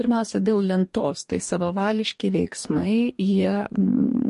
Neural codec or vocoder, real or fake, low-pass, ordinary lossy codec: codec, 44.1 kHz, 2.6 kbps, DAC; fake; 14.4 kHz; MP3, 48 kbps